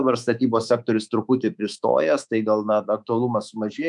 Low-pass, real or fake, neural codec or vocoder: 10.8 kHz; fake; codec, 24 kHz, 3.1 kbps, DualCodec